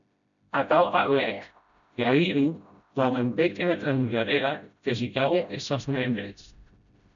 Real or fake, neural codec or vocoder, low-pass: fake; codec, 16 kHz, 0.5 kbps, FreqCodec, smaller model; 7.2 kHz